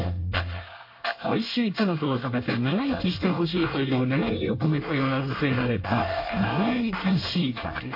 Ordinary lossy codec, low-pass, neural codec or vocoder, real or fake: MP3, 32 kbps; 5.4 kHz; codec, 24 kHz, 1 kbps, SNAC; fake